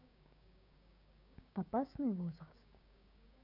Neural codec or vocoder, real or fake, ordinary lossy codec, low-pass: codec, 16 kHz, 6 kbps, DAC; fake; none; 5.4 kHz